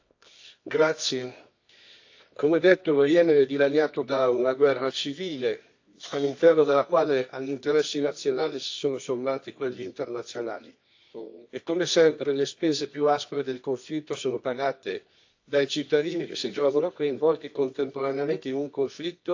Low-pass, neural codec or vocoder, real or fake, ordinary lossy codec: 7.2 kHz; codec, 24 kHz, 0.9 kbps, WavTokenizer, medium music audio release; fake; AAC, 48 kbps